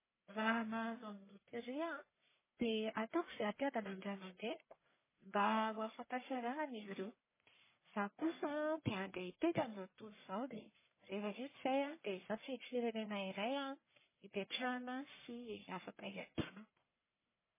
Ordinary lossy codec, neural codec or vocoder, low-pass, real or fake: MP3, 16 kbps; codec, 44.1 kHz, 1.7 kbps, Pupu-Codec; 3.6 kHz; fake